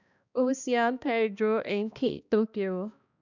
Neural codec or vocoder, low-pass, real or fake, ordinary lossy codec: codec, 16 kHz, 1 kbps, X-Codec, HuBERT features, trained on balanced general audio; 7.2 kHz; fake; none